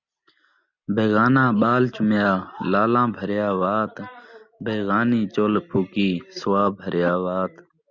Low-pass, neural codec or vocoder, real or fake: 7.2 kHz; none; real